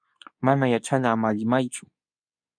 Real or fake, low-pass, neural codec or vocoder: fake; 9.9 kHz; codec, 24 kHz, 0.9 kbps, WavTokenizer, medium speech release version 2